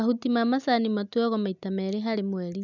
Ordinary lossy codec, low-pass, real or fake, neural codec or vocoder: none; 7.2 kHz; real; none